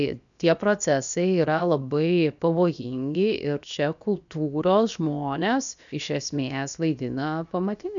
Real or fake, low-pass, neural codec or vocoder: fake; 7.2 kHz; codec, 16 kHz, 0.7 kbps, FocalCodec